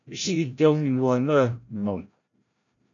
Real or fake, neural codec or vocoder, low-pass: fake; codec, 16 kHz, 0.5 kbps, FreqCodec, larger model; 7.2 kHz